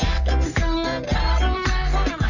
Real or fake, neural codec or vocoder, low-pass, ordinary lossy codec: fake; codec, 44.1 kHz, 3.4 kbps, Pupu-Codec; 7.2 kHz; none